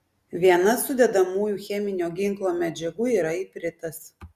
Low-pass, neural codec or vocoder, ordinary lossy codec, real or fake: 14.4 kHz; none; Opus, 64 kbps; real